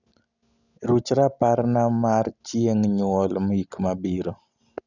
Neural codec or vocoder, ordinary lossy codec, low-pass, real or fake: none; none; 7.2 kHz; real